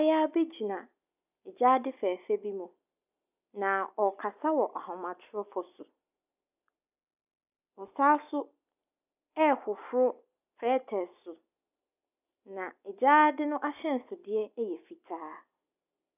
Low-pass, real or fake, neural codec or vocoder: 3.6 kHz; real; none